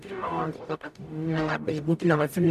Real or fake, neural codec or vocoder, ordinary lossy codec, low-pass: fake; codec, 44.1 kHz, 0.9 kbps, DAC; AAC, 96 kbps; 14.4 kHz